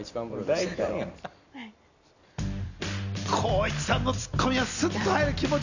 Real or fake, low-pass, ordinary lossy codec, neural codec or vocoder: real; 7.2 kHz; none; none